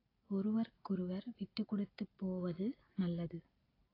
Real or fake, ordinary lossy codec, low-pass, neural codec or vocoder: real; AAC, 24 kbps; 5.4 kHz; none